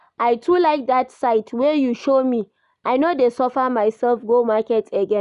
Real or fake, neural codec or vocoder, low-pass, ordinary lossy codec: real; none; 10.8 kHz; none